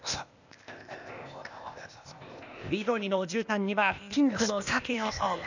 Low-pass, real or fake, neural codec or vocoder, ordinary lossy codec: 7.2 kHz; fake; codec, 16 kHz, 0.8 kbps, ZipCodec; none